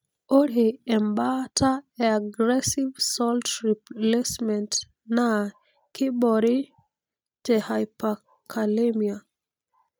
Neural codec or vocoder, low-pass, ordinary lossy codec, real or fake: none; none; none; real